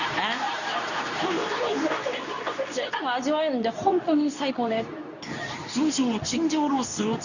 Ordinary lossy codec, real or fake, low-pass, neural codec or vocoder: none; fake; 7.2 kHz; codec, 24 kHz, 0.9 kbps, WavTokenizer, medium speech release version 2